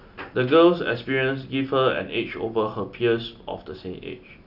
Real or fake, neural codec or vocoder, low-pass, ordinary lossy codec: real; none; 5.4 kHz; none